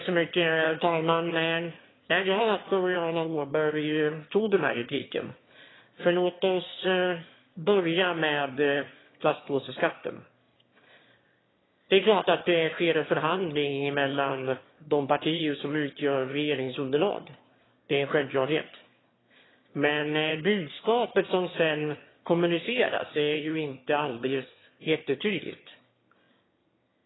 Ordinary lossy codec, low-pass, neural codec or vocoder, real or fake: AAC, 16 kbps; 7.2 kHz; autoencoder, 22.05 kHz, a latent of 192 numbers a frame, VITS, trained on one speaker; fake